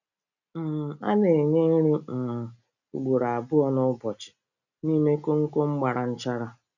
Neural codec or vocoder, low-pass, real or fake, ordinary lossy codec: none; 7.2 kHz; real; none